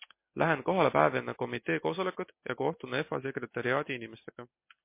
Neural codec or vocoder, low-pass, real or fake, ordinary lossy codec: none; 3.6 kHz; real; MP3, 24 kbps